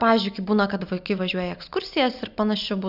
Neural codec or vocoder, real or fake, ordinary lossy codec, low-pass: none; real; Opus, 64 kbps; 5.4 kHz